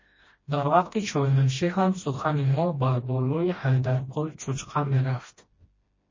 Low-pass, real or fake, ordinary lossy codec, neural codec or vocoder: 7.2 kHz; fake; MP3, 32 kbps; codec, 16 kHz, 1 kbps, FreqCodec, smaller model